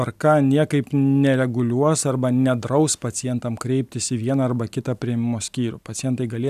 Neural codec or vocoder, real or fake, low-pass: none; real; 14.4 kHz